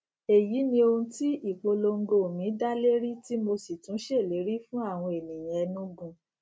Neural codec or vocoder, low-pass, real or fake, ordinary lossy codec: none; none; real; none